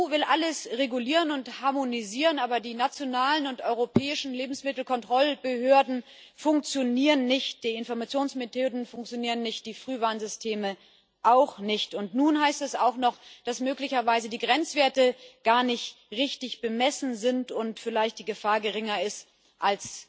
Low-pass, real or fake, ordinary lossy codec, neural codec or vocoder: none; real; none; none